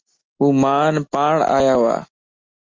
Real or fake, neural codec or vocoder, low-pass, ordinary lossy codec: real; none; 7.2 kHz; Opus, 32 kbps